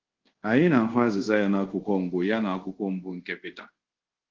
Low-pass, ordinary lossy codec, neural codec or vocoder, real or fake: 7.2 kHz; Opus, 16 kbps; codec, 24 kHz, 0.5 kbps, DualCodec; fake